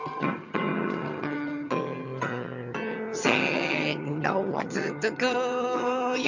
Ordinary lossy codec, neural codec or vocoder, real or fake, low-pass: none; vocoder, 22.05 kHz, 80 mel bands, HiFi-GAN; fake; 7.2 kHz